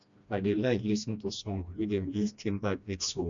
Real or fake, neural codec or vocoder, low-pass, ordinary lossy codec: fake; codec, 16 kHz, 1 kbps, FreqCodec, smaller model; 7.2 kHz; MP3, 64 kbps